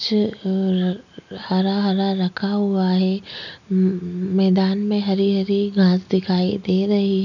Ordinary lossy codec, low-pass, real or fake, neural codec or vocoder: none; 7.2 kHz; real; none